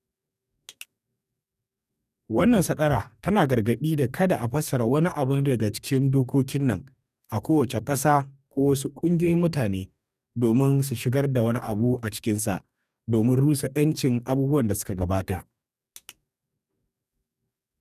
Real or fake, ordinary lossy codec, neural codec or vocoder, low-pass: fake; none; codec, 44.1 kHz, 2.6 kbps, DAC; 14.4 kHz